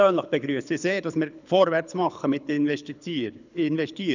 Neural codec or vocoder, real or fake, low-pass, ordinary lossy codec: codec, 24 kHz, 6 kbps, HILCodec; fake; 7.2 kHz; none